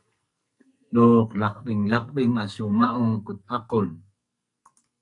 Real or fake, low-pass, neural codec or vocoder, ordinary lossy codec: fake; 10.8 kHz; codec, 44.1 kHz, 2.6 kbps, SNAC; MP3, 96 kbps